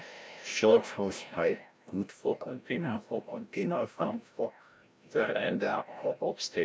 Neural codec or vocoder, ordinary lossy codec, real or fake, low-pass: codec, 16 kHz, 0.5 kbps, FreqCodec, larger model; none; fake; none